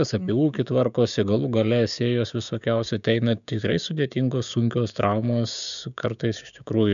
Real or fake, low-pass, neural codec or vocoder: fake; 7.2 kHz; codec, 16 kHz, 6 kbps, DAC